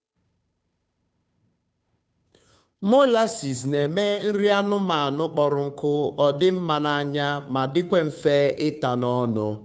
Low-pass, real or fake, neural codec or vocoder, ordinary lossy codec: none; fake; codec, 16 kHz, 2 kbps, FunCodec, trained on Chinese and English, 25 frames a second; none